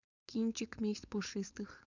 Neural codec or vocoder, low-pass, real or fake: codec, 16 kHz, 4.8 kbps, FACodec; 7.2 kHz; fake